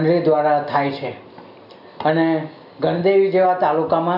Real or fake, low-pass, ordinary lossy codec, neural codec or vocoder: real; 5.4 kHz; none; none